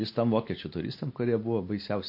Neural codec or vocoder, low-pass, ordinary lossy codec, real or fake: none; 5.4 kHz; MP3, 32 kbps; real